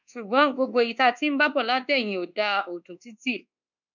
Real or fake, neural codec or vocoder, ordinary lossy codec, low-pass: fake; codec, 24 kHz, 1.2 kbps, DualCodec; none; 7.2 kHz